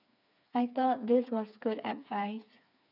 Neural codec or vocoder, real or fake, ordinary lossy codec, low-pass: codec, 16 kHz, 4 kbps, FreqCodec, smaller model; fake; none; 5.4 kHz